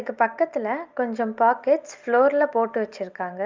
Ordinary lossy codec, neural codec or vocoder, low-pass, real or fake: Opus, 24 kbps; none; 7.2 kHz; real